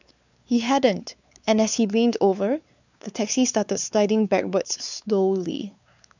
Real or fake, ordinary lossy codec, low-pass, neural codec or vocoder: fake; none; 7.2 kHz; codec, 16 kHz, 4 kbps, X-Codec, WavLM features, trained on Multilingual LibriSpeech